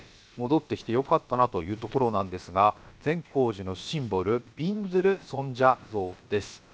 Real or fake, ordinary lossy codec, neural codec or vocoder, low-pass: fake; none; codec, 16 kHz, about 1 kbps, DyCAST, with the encoder's durations; none